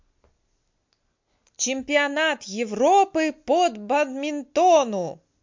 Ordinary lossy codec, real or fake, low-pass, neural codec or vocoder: MP3, 48 kbps; real; 7.2 kHz; none